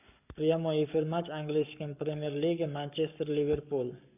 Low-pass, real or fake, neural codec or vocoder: 3.6 kHz; fake; codec, 44.1 kHz, 7.8 kbps, Pupu-Codec